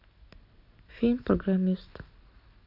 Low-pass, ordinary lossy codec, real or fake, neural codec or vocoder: 5.4 kHz; none; real; none